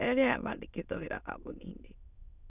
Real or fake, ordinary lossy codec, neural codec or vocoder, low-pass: fake; none; autoencoder, 22.05 kHz, a latent of 192 numbers a frame, VITS, trained on many speakers; 3.6 kHz